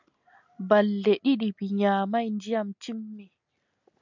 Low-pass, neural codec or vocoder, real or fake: 7.2 kHz; none; real